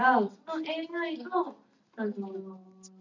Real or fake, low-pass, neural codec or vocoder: real; 7.2 kHz; none